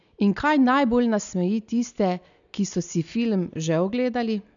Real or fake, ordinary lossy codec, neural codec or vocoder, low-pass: real; none; none; 7.2 kHz